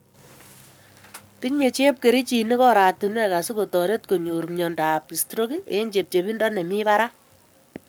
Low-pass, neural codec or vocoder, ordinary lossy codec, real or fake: none; codec, 44.1 kHz, 7.8 kbps, Pupu-Codec; none; fake